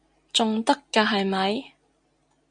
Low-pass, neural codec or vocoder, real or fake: 9.9 kHz; none; real